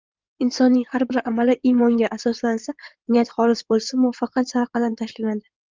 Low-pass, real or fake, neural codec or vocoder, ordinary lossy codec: 7.2 kHz; fake; codec, 16 kHz in and 24 kHz out, 2.2 kbps, FireRedTTS-2 codec; Opus, 24 kbps